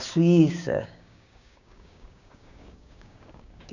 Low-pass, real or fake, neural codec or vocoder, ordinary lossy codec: 7.2 kHz; fake; codec, 16 kHz, 6 kbps, DAC; none